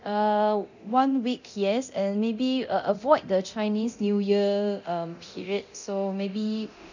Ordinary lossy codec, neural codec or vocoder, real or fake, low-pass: none; codec, 24 kHz, 0.9 kbps, DualCodec; fake; 7.2 kHz